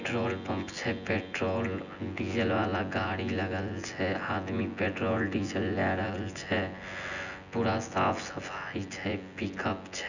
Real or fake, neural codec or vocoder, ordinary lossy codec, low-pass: fake; vocoder, 24 kHz, 100 mel bands, Vocos; none; 7.2 kHz